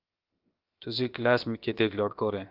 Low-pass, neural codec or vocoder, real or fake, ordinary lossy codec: 5.4 kHz; codec, 16 kHz, 0.7 kbps, FocalCodec; fake; Opus, 24 kbps